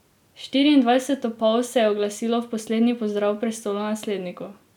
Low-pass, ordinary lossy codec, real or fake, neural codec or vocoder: 19.8 kHz; none; real; none